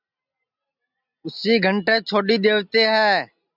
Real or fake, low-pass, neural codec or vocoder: real; 5.4 kHz; none